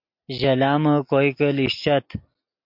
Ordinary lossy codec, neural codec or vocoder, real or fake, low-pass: MP3, 48 kbps; none; real; 5.4 kHz